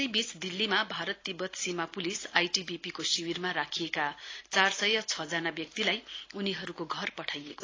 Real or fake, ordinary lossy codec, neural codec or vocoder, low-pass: real; AAC, 32 kbps; none; 7.2 kHz